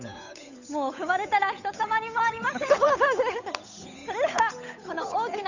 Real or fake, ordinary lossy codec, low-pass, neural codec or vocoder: fake; none; 7.2 kHz; codec, 16 kHz, 8 kbps, FunCodec, trained on Chinese and English, 25 frames a second